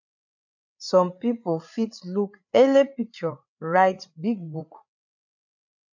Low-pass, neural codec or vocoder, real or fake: 7.2 kHz; codec, 16 kHz, 8 kbps, FreqCodec, larger model; fake